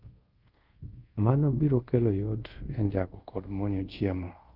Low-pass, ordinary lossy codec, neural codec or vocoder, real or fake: 5.4 kHz; Opus, 32 kbps; codec, 24 kHz, 0.5 kbps, DualCodec; fake